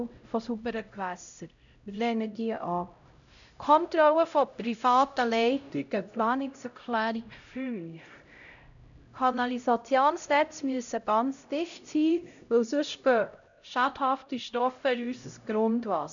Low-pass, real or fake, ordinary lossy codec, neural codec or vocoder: 7.2 kHz; fake; none; codec, 16 kHz, 0.5 kbps, X-Codec, HuBERT features, trained on LibriSpeech